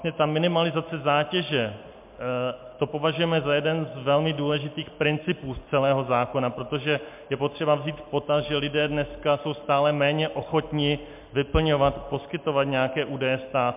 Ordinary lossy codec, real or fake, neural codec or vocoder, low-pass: MP3, 32 kbps; real; none; 3.6 kHz